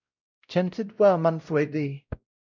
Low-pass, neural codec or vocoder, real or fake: 7.2 kHz; codec, 16 kHz, 0.5 kbps, X-Codec, WavLM features, trained on Multilingual LibriSpeech; fake